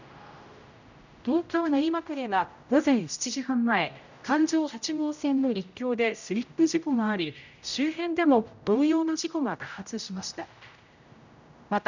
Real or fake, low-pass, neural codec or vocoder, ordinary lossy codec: fake; 7.2 kHz; codec, 16 kHz, 0.5 kbps, X-Codec, HuBERT features, trained on general audio; none